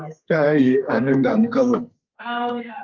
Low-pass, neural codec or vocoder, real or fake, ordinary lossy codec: 7.2 kHz; codec, 32 kHz, 1.9 kbps, SNAC; fake; Opus, 24 kbps